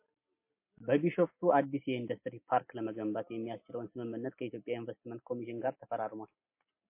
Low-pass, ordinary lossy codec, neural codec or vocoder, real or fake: 3.6 kHz; MP3, 24 kbps; none; real